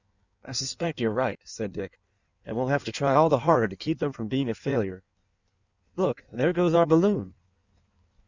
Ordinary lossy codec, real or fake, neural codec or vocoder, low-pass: Opus, 64 kbps; fake; codec, 16 kHz in and 24 kHz out, 1.1 kbps, FireRedTTS-2 codec; 7.2 kHz